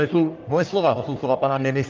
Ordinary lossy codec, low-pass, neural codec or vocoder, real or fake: Opus, 24 kbps; 7.2 kHz; codec, 44.1 kHz, 1.7 kbps, Pupu-Codec; fake